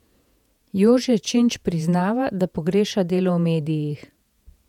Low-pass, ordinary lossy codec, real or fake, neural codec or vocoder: 19.8 kHz; none; fake; vocoder, 48 kHz, 128 mel bands, Vocos